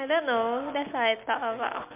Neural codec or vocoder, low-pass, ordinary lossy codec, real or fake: none; 3.6 kHz; MP3, 32 kbps; real